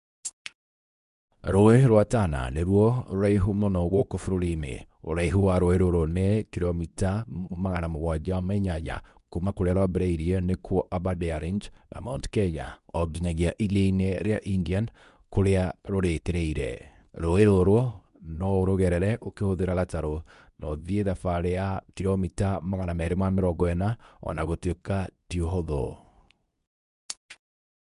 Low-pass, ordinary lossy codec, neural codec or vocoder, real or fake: 10.8 kHz; none; codec, 24 kHz, 0.9 kbps, WavTokenizer, medium speech release version 2; fake